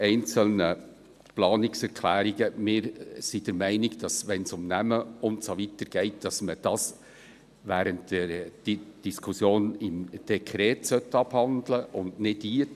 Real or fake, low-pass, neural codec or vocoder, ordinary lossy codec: real; 14.4 kHz; none; none